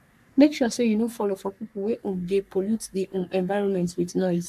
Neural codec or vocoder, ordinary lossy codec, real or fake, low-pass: codec, 44.1 kHz, 3.4 kbps, Pupu-Codec; none; fake; 14.4 kHz